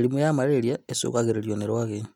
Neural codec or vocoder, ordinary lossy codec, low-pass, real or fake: none; none; 19.8 kHz; real